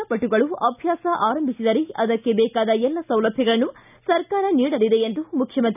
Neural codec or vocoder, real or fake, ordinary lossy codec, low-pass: none; real; none; 3.6 kHz